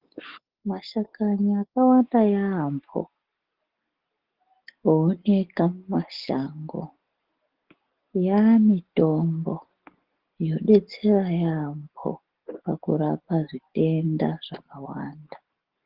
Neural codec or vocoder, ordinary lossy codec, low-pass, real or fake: none; Opus, 16 kbps; 5.4 kHz; real